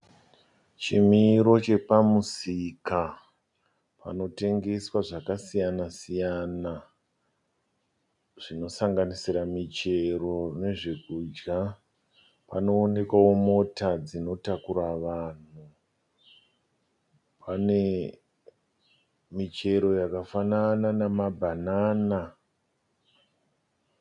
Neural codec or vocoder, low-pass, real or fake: none; 10.8 kHz; real